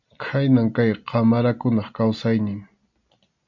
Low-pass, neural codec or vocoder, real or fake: 7.2 kHz; none; real